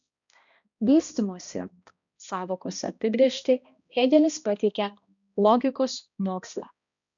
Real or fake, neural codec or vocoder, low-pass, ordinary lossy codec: fake; codec, 16 kHz, 1 kbps, X-Codec, HuBERT features, trained on balanced general audio; 7.2 kHz; MP3, 96 kbps